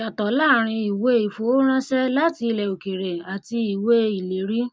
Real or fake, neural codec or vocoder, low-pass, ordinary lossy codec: real; none; none; none